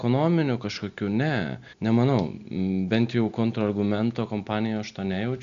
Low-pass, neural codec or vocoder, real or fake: 7.2 kHz; none; real